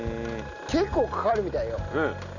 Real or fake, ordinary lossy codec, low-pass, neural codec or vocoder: real; none; 7.2 kHz; none